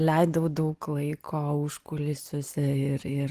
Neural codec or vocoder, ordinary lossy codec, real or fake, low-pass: none; Opus, 24 kbps; real; 14.4 kHz